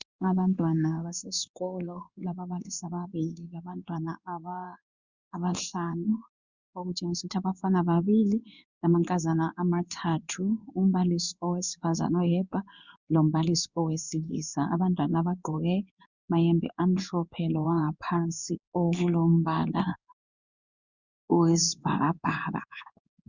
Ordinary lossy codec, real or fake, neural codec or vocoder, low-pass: Opus, 64 kbps; fake; codec, 16 kHz in and 24 kHz out, 1 kbps, XY-Tokenizer; 7.2 kHz